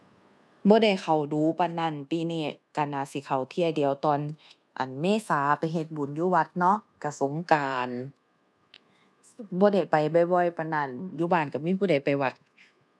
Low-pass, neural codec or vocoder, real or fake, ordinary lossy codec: none; codec, 24 kHz, 1.2 kbps, DualCodec; fake; none